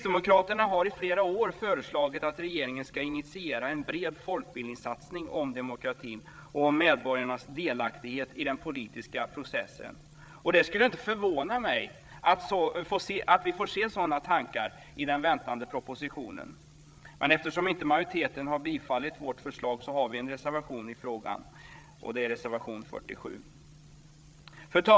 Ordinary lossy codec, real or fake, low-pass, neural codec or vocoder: none; fake; none; codec, 16 kHz, 8 kbps, FreqCodec, larger model